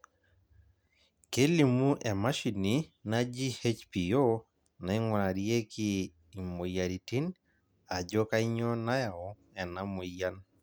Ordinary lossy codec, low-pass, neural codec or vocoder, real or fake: none; none; none; real